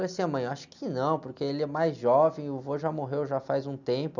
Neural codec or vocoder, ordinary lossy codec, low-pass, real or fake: none; none; 7.2 kHz; real